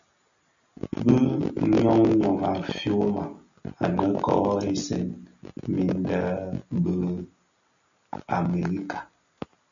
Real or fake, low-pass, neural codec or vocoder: real; 7.2 kHz; none